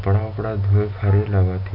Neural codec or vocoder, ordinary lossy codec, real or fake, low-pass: none; none; real; 5.4 kHz